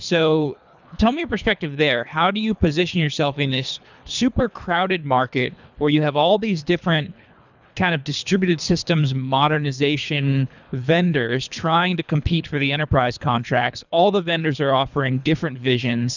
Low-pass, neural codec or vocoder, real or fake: 7.2 kHz; codec, 24 kHz, 3 kbps, HILCodec; fake